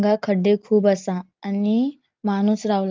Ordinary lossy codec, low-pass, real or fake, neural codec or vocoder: Opus, 24 kbps; 7.2 kHz; real; none